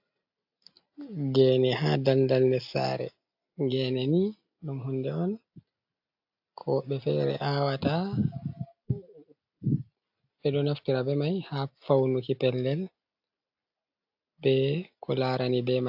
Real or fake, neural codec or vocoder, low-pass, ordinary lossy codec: real; none; 5.4 kHz; AAC, 48 kbps